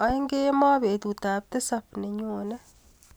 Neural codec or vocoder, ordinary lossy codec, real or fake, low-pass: none; none; real; none